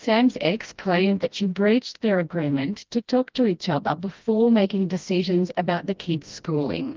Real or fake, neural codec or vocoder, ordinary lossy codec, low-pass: fake; codec, 16 kHz, 1 kbps, FreqCodec, smaller model; Opus, 24 kbps; 7.2 kHz